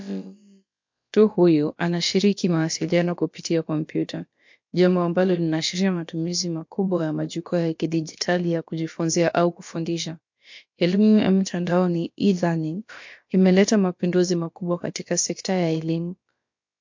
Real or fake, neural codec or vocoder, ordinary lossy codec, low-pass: fake; codec, 16 kHz, about 1 kbps, DyCAST, with the encoder's durations; MP3, 48 kbps; 7.2 kHz